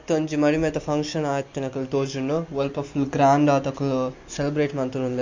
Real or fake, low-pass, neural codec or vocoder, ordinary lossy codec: real; 7.2 kHz; none; MP3, 48 kbps